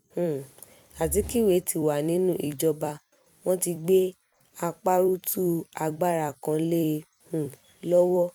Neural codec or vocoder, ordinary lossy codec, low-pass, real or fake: vocoder, 44.1 kHz, 128 mel bands every 256 samples, BigVGAN v2; none; 19.8 kHz; fake